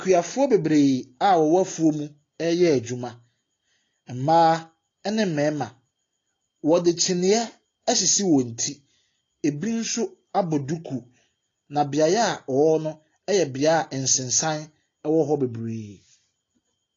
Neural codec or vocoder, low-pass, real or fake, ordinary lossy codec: none; 7.2 kHz; real; AAC, 32 kbps